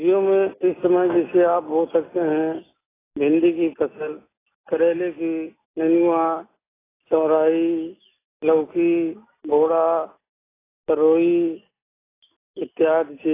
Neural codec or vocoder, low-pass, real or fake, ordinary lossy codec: none; 3.6 kHz; real; AAC, 16 kbps